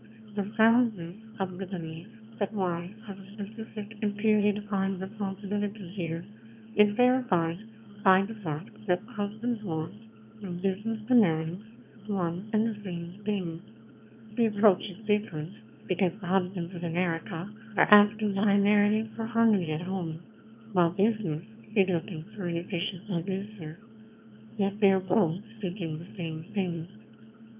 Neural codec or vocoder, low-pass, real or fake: autoencoder, 22.05 kHz, a latent of 192 numbers a frame, VITS, trained on one speaker; 3.6 kHz; fake